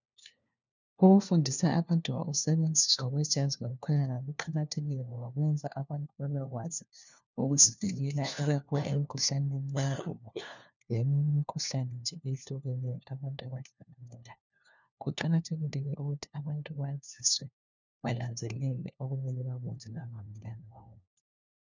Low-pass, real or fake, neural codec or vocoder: 7.2 kHz; fake; codec, 16 kHz, 1 kbps, FunCodec, trained on LibriTTS, 50 frames a second